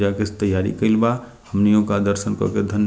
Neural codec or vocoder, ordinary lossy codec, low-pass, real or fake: none; none; none; real